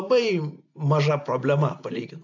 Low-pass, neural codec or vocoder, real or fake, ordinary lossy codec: 7.2 kHz; none; real; AAC, 48 kbps